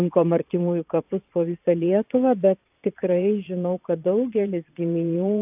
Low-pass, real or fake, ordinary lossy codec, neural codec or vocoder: 3.6 kHz; fake; AAC, 32 kbps; vocoder, 22.05 kHz, 80 mel bands, Vocos